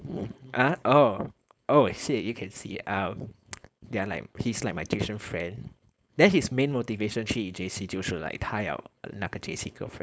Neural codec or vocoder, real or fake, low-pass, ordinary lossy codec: codec, 16 kHz, 4.8 kbps, FACodec; fake; none; none